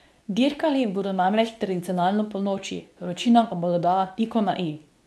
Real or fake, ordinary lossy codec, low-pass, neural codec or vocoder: fake; none; none; codec, 24 kHz, 0.9 kbps, WavTokenizer, medium speech release version 2